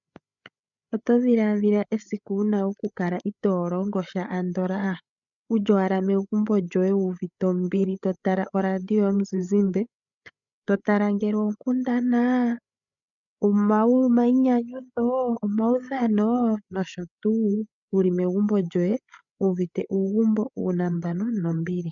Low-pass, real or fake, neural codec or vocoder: 7.2 kHz; fake; codec, 16 kHz, 8 kbps, FreqCodec, larger model